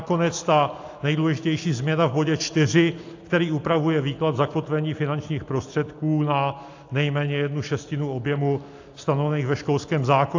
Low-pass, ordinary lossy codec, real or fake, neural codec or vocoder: 7.2 kHz; AAC, 48 kbps; real; none